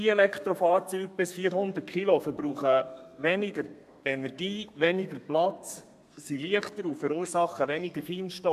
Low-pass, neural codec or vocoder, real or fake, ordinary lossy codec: 14.4 kHz; codec, 32 kHz, 1.9 kbps, SNAC; fake; MP3, 96 kbps